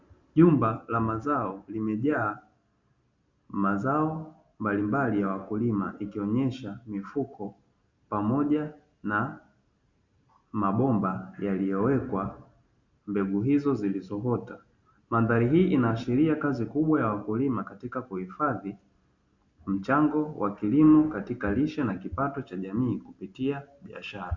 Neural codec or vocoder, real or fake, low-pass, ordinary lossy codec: none; real; 7.2 kHz; Opus, 64 kbps